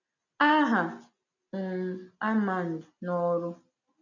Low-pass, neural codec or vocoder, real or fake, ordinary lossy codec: 7.2 kHz; none; real; none